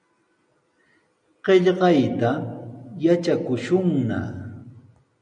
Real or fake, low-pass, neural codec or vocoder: real; 9.9 kHz; none